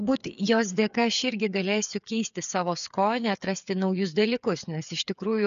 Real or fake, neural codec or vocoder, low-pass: fake; codec, 16 kHz, 8 kbps, FreqCodec, smaller model; 7.2 kHz